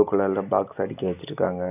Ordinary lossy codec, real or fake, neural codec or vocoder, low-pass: none; real; none; 3.6 kHz